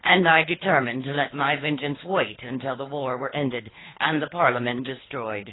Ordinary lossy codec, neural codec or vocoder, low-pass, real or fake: AAC, 16 kbps; codec, 24 kHz, 3 kbps, HILCodec; 7.2 kHz; fake